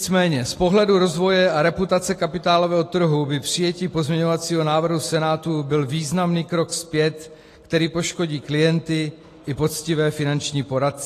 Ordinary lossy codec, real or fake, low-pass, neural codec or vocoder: AAC, 48 kbps; real; 14.4 kHz; none